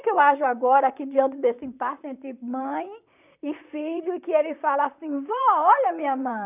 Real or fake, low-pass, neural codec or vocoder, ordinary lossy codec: fake; 3.6 kHz; vocoder, 44.1 kHz, 128 mel bands, Pupu-Vocoder; none